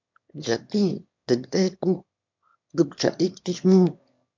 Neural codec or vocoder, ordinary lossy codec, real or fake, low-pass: autoencoder, 22.05 kHz, a latent of 192 numbers a frame, VITS, trained on one speaker; MP3, 64 kbps; fake; 7.2 kHz